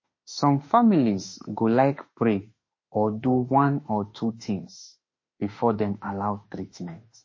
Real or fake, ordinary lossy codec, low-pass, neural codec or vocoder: fake; MP3, 32 kbps; 7.2 kHz; autoencoder, 48 kHz, 32 numbers a frame, DAC-VAE, trained on Japanese speech